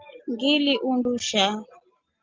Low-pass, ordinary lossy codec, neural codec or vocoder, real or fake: 7.2 kHz; Opus, 32 kbps; none; real